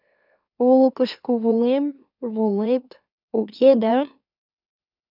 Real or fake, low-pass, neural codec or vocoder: fake; 5.4 kHz; autoencoder, 44.1 kHz, a latent of 192 numbers a frame, MeloTTS